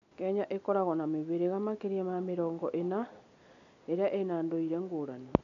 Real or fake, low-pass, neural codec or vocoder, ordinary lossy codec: real; 7.2 kHz; none; none